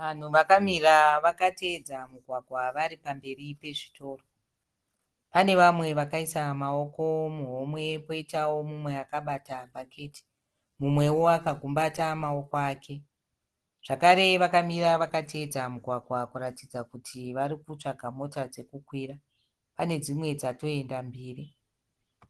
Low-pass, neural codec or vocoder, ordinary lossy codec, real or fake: 10.8 kHz; none; Opus, 16 kbps; real